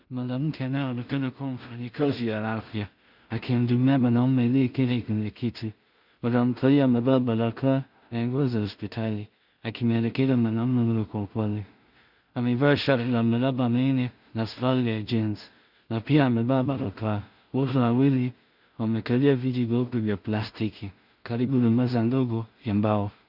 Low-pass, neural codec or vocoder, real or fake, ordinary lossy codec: 5.4 kHz; codec, 16 kHz in and 24 kHz out, 0.4 kbps, LongCat-Audio-Codec, two codebook decoder; fake; Opus, 64 kbps